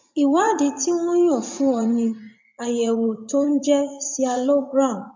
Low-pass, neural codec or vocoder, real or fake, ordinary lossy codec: 7.2 kHz; vocoder, 44.1 kHz, 80 mel bands, Vocos; fake; MP3, 64 kbps